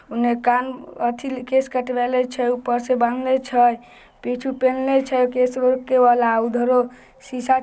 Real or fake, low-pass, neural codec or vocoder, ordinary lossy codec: real; none; none; none